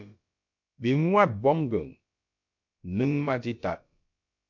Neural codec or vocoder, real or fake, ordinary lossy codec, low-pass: codec, 16 kHz, about 1 kbps, DyCAST, with the encoder's durations; fake; MP3, 48 kbps; 7.2 kHz